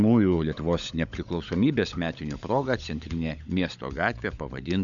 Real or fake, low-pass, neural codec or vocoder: fake; 7.2 kHz; codec, 16 kHz, 16 kbps, FunCodec, trained on LibriTTS, 50 frames a second